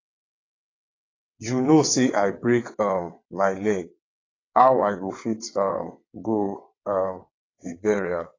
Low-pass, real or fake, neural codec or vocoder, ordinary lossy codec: 7.2 kHz; fake; vocoder, 22.05 kHz, 80 mel bands, WaveNeXt; AAC, 32 kbps